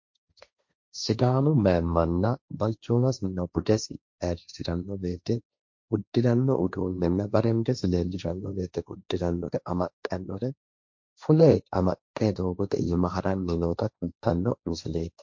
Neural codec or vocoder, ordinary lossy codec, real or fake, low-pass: codec, 16 kHz, 1.1 kbps, Voila-Tokenizer; MP3, 48 kbps; fake; 7.2 kHz